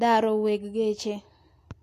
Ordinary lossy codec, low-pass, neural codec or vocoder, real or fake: AAC, 64 kbps; 14.4 kHz; none; real